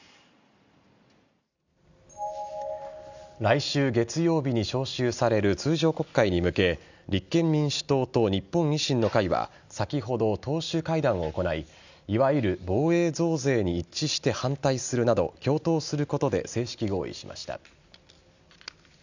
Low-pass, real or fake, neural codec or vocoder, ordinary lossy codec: 7.2 kHz; real; none; none